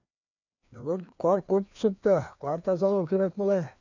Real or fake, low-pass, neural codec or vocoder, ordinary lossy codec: fake; 7.2 kHz; codec, 16 kHz, 2 kbps, FreqCodec, larger model; none